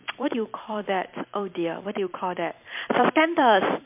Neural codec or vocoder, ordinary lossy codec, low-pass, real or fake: vocoder, 44.1 kHz, 128 mel bands every 256 samples, BigVGAN v2; MP3, 32 kbps; 3.6 kHz; fake